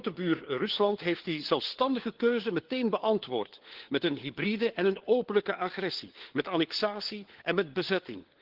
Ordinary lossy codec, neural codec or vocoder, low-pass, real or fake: Opus, 16 kbps; codec, 16 kHz, 4 kbps, FunCodec, trained on LibriTTS, 50 frames a second; 5.4 kHz; fake